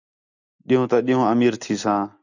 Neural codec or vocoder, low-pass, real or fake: none; 7.2 kHz; real